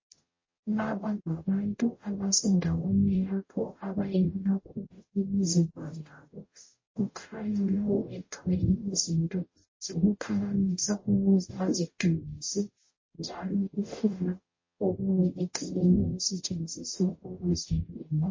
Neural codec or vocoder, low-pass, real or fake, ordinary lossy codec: codec, 44.1 kHz, 0.9 kbps, DAC; 7.2 kHz; fake; MP3, 32 kbps